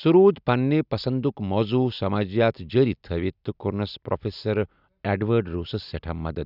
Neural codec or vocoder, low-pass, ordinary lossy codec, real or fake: none; 5.4 kHz; none; real